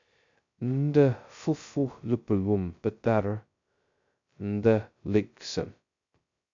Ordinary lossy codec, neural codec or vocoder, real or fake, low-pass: MP3, 64 kbps; codec, 16 kHz, 0.2 kbps, FocalCodec; fake; 7.2 kHz